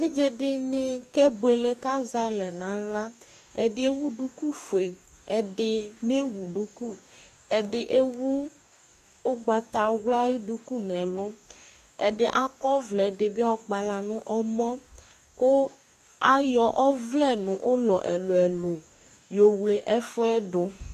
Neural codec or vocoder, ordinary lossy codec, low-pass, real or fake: codec, 44.1 kHz, 2.6 kbps, DAC; AAC, 96 kbps; 14.4 kHz; fake